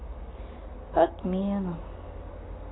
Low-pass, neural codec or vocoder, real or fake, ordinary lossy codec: 7.2 kHz; none; real; AAC, 16 kbps